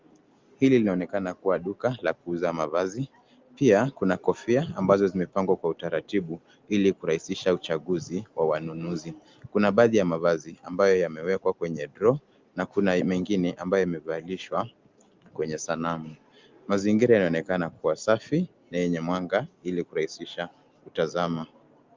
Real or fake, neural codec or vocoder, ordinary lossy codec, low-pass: real; none; Opus, 32 kbps; 7.2 kHz